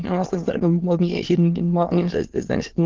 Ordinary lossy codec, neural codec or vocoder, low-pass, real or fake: Opus, 16 kbps; autoencoder, 22.05 kHz, a latent of 192 numbers a frame, VITS, trained on many speakers; 7.2 kHz; fake